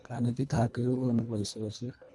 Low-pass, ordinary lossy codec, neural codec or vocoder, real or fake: none; none; codec, 24 kHz, 1.5 kbps, HILCodec; fake